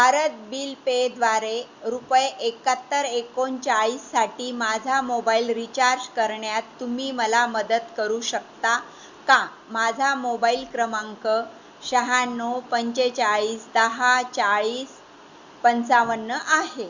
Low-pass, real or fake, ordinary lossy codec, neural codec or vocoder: 7.2 kHz; real; Opus, 64 kbps; none